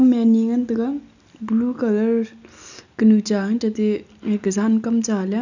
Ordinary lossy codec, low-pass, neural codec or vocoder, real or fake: none; 7.2 kHz; none; real